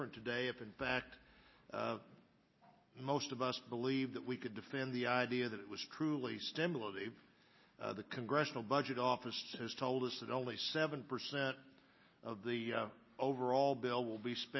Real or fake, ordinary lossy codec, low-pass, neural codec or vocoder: real; MP3, 24 kbps; 7.2 kHz; none